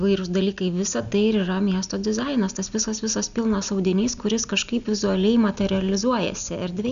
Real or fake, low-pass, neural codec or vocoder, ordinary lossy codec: real; 7.2 kHz; none; MP3, 96 kbps